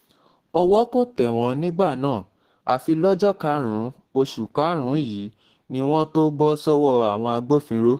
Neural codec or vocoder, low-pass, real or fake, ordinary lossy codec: codec, 32 kHz, 1.9 kbps, SNAC; 14.4 kHz; fake; Opus, 16 kbps